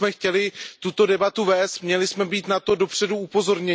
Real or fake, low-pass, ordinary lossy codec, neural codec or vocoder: real; none; none; none